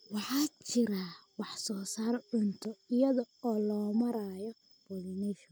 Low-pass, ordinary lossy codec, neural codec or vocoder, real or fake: none; none; none; real